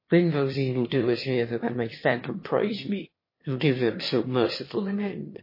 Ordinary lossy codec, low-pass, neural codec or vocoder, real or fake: MP3, 24 kbps; 5.4 kHz; autoencoder, 22.05 kHz, a latent of 192 numbers a frame, VITS, trained on one speaker; fake